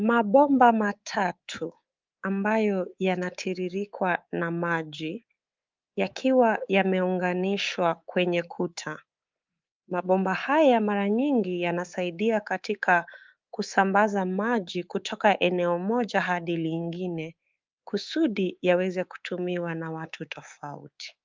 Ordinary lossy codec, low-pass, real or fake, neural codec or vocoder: Opus, 24 kbps; 7.2 kHz; fake; codec, 24 kHz, 3.1 kbps, DualCodec